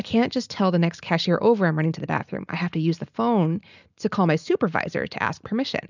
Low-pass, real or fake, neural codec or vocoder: 7.2 kHz; real; none